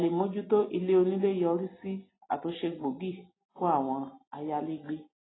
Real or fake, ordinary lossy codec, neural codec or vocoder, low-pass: real; AAC, 16 kbps; none; 7.2 kHz